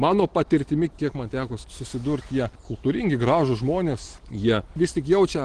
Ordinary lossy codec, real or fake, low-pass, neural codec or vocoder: Opus, 16 kbps; real; 9.9 kHz; none